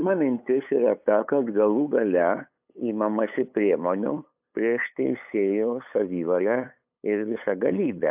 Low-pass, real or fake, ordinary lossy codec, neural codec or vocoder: 3.6 kHz; fake; AAC, 32 kbps; codec, 16 kHz, 8 kbps, FunCodec, trained on LibriTTS, 25 frames a second